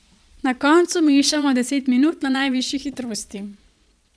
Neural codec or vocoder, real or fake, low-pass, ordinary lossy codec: vocoder, 22.05 kHz, 80 mel bands, Vocos; fake; none; none